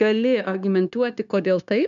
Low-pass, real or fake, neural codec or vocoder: 7.2 kHz; fake; codec, 16 kHz, 0.9 kbps, LongCat-Audio-Codec